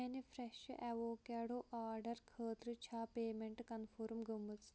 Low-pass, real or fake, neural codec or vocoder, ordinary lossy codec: none; real; none; none